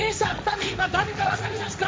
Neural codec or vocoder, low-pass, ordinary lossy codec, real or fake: codec, 16 kHz, 1.1 kbps, Voila-Tokenizer; none; none; fake